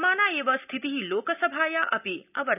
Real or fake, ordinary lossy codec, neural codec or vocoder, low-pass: real; none; none; 3.6 kHz